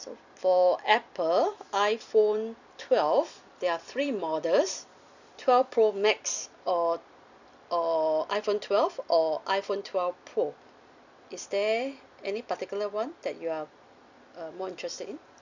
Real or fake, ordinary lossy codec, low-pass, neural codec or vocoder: fake; none; 7.2 kHz; vocoder, 44.1 kHz, 128 mel bands every 256 samples, BigVGAN v2